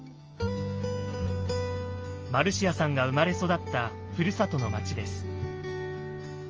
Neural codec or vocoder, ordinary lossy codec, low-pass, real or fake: none; Opus, 24 kbps; 7.2 kHz; real